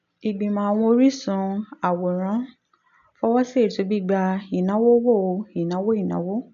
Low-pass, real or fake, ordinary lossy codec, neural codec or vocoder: 7.2 kHz; real; MP3, 96 kbps; none